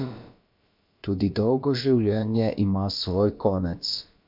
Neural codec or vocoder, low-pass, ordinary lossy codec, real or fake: codec, 16 kHz, about 1 kbps, DyCAST, with the encoder's durations; 5.4 kHz; MP3, 48 kbps; fake